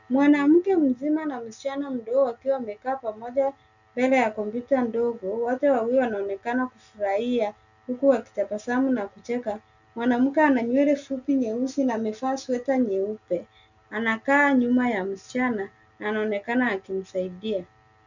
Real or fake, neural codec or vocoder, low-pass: real; none; 7.2 kHz